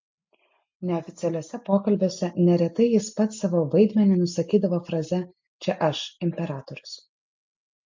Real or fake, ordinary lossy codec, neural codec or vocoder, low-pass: real; MP3, 48 kbps; none; 7.2 kHz